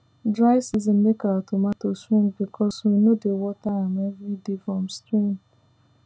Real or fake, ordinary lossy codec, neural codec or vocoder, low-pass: real; none; none; none